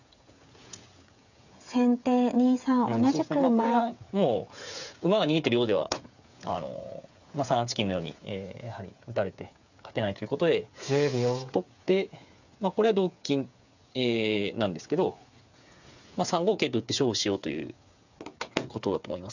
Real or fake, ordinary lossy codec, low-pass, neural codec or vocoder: fake; none; 7.2 kHz; codec, 16 kHz, 8 kbps, FreqCodec, smaller model